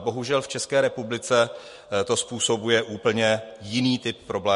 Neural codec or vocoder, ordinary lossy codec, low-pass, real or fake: none; MP3, 48 kbps; 14.4 kHz; real